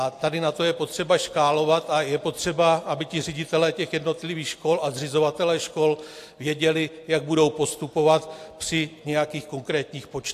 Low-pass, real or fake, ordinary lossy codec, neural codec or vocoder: 14.4 kHz; real; AAC, 64 kbps; none